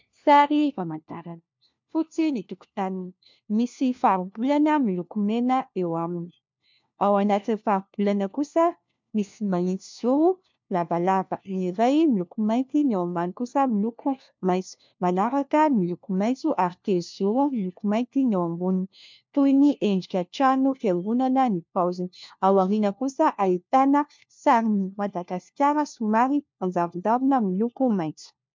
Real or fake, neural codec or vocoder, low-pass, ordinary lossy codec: fake; codec, 16 kHz, 1 kbps, FunCodec, trained on LibriTTS, 50 frames a second; 7.2 kHz; MP3, 64 kbps